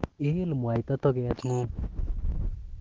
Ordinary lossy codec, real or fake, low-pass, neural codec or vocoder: Opus, 16 kbps; real; 7.2 kHz; none